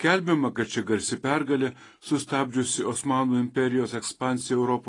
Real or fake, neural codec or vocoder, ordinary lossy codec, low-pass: real; none; AAC, 32 kbps; 10.8 kHz